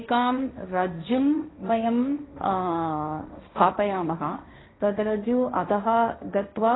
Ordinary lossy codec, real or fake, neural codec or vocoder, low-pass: AAC, 16 kbps; fake; codec, 16 kHz, 1.1 kbps, Voila-Tokenizer; 7.2 kHz